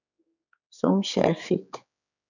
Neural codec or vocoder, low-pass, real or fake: codec, 16 kHz, 4 kbps, X-Codec, HuBERT features, trained on general audio; 7.2 kHz; fake